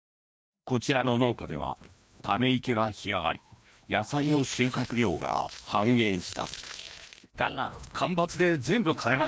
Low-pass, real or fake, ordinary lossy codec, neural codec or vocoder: none; fake; none; codec, 16 kHz, 1 kbps, FreqCodec, larger model